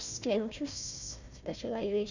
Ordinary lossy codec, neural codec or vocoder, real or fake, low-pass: none; codec, 16 kHz, 1 kbps, FunCodec, trained on Chinese and English, 50 frames a second; fake; 7.2 kHz